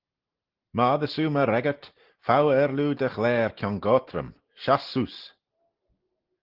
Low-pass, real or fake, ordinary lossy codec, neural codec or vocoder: 5.4 kHz; real; Opus, 16 kbps; none